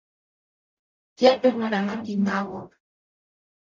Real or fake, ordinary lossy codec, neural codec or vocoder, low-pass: fake; MP3, 64 kbps; codec, 44.1 kHz, 0.9 kbps, DAC; 7.2 kHz